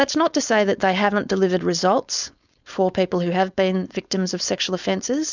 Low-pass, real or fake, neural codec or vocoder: 7.2 kHz; fake; codec, 16 kHz, 4.8 kbps, FACodec